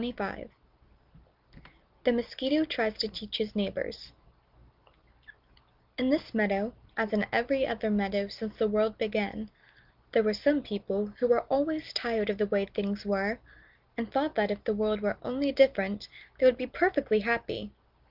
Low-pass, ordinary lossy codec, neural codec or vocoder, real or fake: 5.4 kHz; Opus, 24 kbps; none; real